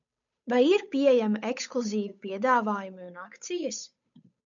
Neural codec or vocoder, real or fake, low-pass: codec, 16 kHz, 8 kbps, FunCodec, trained on Chinese and English, 25 frames a second; fake; 7.2 kHz